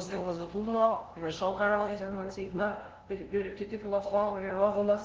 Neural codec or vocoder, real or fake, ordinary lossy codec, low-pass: codec, 16 kHz, 0.5 kbps, FunCodec, trained on LibriTTS, 25 frames a second; fake; Opus, 16 kbps; 7.2 kHz